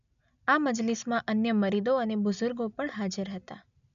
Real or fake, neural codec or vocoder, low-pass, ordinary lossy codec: real; none; 7.2 kHz; none